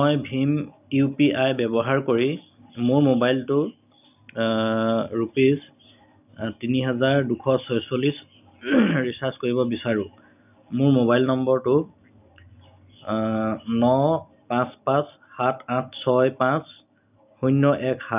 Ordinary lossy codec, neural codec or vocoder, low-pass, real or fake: none; none; 3.6 kHz; real